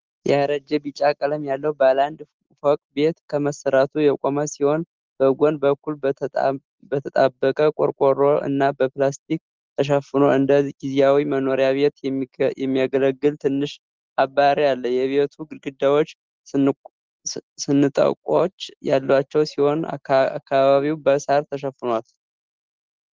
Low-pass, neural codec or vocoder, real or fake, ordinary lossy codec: 7.2 kHz; none; real; Opus, 16 kbps